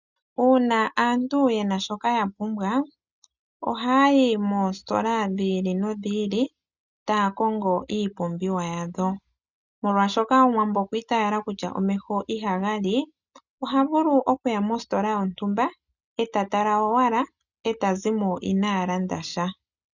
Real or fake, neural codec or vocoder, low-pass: real; none; 7.2 kHz